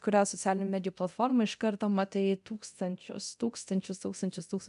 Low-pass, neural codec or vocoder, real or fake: 10.8 kHz; codec, 24 kHz, 0.9 kbps, DualCodec; fake